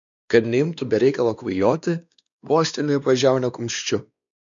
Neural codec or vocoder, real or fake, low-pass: codec, 16 kHz, 2 kbps, X-Codec, WavLM features, trained on Multilingual LibriSpeech; fake; 7.2 kHz